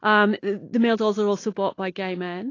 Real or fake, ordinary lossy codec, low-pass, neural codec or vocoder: real; AAC, 32 kbps; 7.2 kHz; none